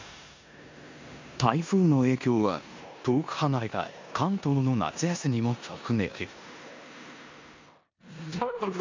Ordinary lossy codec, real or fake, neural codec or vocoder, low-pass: MP3, 64 kbps; fake; codec, 16 kHz in and 24 kHz out, 0.9 kbps, LongCat-Audio-Codec, four codebook decoder; 7.2 kHz